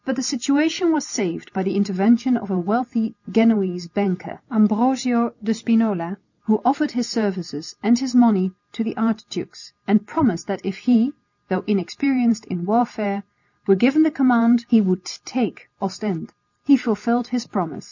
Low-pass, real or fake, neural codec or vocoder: 7.2 kHz; real; none